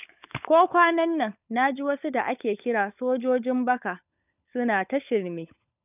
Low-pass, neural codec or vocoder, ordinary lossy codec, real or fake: 3.6 kHz; codec, 16 kHz, 16 kbps, FunCodec, trained on LibriTTS, 50 frames a second; none; fake